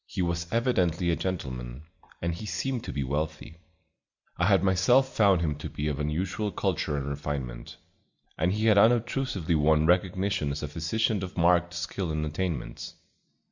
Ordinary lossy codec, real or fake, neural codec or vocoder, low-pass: Opus, 64 kbps; real; none; 7.2 kHz